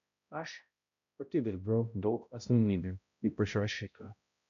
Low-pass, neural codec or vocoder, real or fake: 7.2 kHz; codec, 16 kHz, 0.5 kbps, X-Codec, HuBERT features, trained on balanced general audio; fake